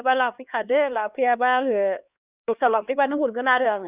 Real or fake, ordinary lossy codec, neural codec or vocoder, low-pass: fake; Opus, 64 kbps; codec, 16 kHz, 2 kbps, X-Codec, HuBERT features, trained on LibriSpeech; 3.6 kHz